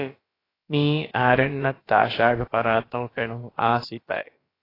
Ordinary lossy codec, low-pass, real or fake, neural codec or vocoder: AAC, 24 kbps; 5.4 kHz; fake; codec, 16 kHz, about 1 kbps, DyCAST, with the encoder's durations